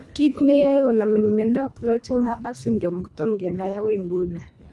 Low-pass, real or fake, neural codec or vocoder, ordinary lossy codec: none; fake; codec, 24 kHz, 1.5 kbps, HILCodec; none